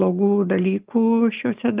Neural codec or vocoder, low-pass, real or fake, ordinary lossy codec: none; 3.6 kHz; real; Opus, 32 kbps